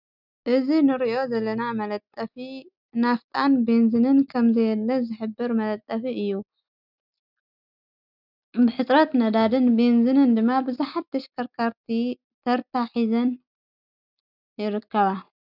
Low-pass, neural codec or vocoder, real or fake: 5.4 kHz; none; real